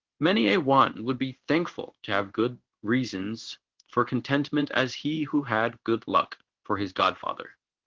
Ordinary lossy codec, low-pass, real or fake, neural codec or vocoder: Opus, 16 kbps; 7.2 kHz; fake; codec, 16 kHz in and 24 kHz out, 1 kbps, XY-Tokenizer